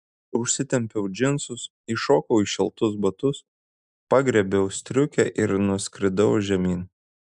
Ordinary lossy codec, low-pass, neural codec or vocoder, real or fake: MP3, 96 kbps; 10.8 kHz; none; real